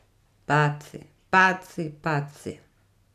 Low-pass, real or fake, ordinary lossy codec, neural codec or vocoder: 14.4 kHz; real; none; none